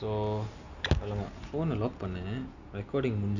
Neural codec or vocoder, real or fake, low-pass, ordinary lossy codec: none; real; 7.2 kHz; none